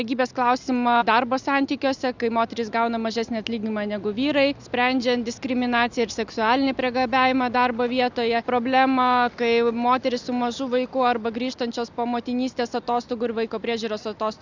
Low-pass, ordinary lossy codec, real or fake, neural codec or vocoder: 7.2 kHz; Opus, 64 kbps; real; none